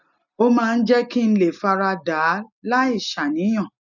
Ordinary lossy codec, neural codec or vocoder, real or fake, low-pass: none; none; real; 7.2 kHz